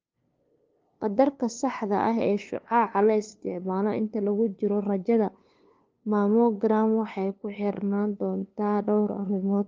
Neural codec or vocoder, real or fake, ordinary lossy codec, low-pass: codec, 16 kHz, 2 kbps, FunCodec, trained on LibriTTS, 25 frames a second; fake; Opus, 24 kbps; 7.2 kHz